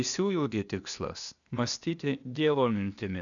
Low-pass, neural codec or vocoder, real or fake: 7.2 kHz; codec, 16 kHz, 0.8 kbps, ZipCodec; fake